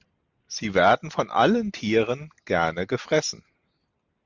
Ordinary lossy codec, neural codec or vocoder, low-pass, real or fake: Opus, 64 kbps; none; 7.2 kHz; real